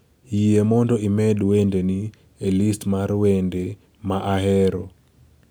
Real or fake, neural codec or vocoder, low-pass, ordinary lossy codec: real; none; none; none